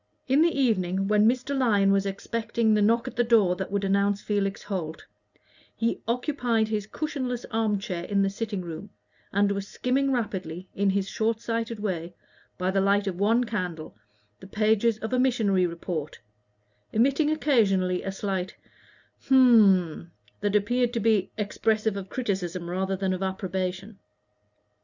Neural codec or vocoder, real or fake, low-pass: none; real; 7.2 kHz